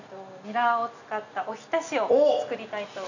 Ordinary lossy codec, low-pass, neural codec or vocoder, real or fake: none; 7.2 kHz; none; real